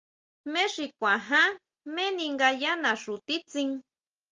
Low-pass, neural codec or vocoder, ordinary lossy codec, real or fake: 7.2 kHz; none; Opus, 32 kbps; real